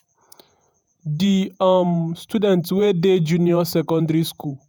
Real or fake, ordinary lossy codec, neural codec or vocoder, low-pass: fake; none; vocoder, 48 kHz, 128 mel bands, Vocos; none